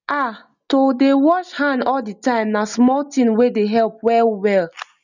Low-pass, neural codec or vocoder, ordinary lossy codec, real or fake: 7.2 kHz; none; none; real